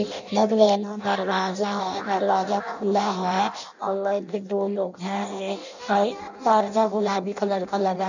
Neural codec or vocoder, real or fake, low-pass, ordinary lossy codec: codec, 16 kHz in and 24 kHz out, 0.6 kbps, FireRedTTS-2 codec; fake; 7.2 kHz; none